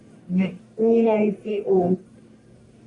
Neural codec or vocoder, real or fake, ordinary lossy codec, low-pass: codec, 44.1 kHz, 1.7 kbps, Pupu-Codec; fake; AAC, 48 kbps; 10.8 kHz